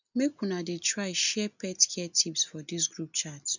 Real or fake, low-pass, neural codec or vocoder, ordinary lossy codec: real; 7.2 kHz; none; none